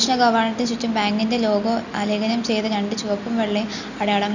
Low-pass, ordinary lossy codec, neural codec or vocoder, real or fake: 7.2 kHz; none; none; real